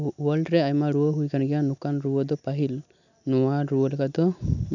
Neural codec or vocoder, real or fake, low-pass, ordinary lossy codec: none; real; 7.2 kHz; none